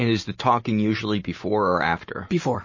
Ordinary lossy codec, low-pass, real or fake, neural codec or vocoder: MP3, 32 kbps; 7.2 kHz; real; none